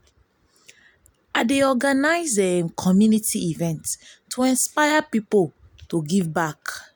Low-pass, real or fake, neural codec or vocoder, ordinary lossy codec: none; real; none; none